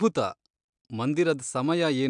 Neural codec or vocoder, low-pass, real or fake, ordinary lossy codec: none; 9.9 kHz; real; MP3, 96 kbps